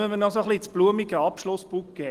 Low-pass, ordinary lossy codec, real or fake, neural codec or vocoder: 14.4 kHz; Opus, 24 kbps; real; none